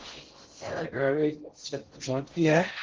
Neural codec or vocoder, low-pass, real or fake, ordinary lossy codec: codec, 16 kHz in and 24 kHz out, 0.6 kbps, FocalCodec, streaming, 4096 codes; 7.2 kHz; fake; Opus, 16 kbps